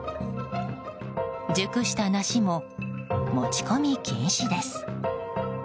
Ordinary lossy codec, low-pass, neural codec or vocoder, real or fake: none; none; none; real